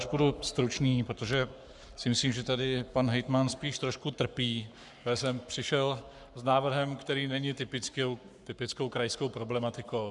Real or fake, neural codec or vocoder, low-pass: fake; codec, 44.1 kHz, 7.8 kbps, Pupu-Codec; 10.8 kHz